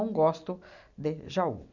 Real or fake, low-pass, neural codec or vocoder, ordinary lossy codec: real; 7.2 kHz; none; none